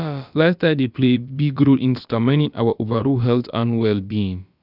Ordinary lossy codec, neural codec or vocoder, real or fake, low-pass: none; codec, 16 kHz, about 1 kbps, DyCAST, with the encoder's durations; fake; 5.4 kHz